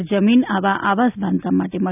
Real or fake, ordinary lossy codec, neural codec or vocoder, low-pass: real; none; none; 3.6 kHz